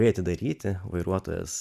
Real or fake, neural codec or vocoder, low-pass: real; none; 14.4 kHz